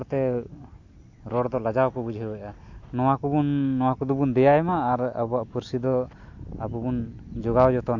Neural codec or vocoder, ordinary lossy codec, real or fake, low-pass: none; AAC, 48 kbps; real; 7.2 kHz